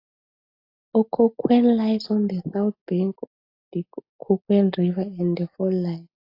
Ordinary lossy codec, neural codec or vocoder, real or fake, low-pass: AAC, 32 kbps; none; real; 5.4 kHz